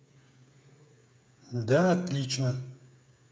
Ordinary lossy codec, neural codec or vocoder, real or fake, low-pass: none; codec, 16 kHz, 8 kbps, FreqCodec, smaller model; fake; none